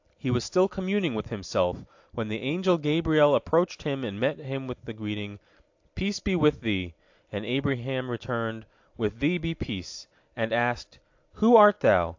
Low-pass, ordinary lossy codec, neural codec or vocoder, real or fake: 7.2 kHz; AAC, 48 kbps; none; real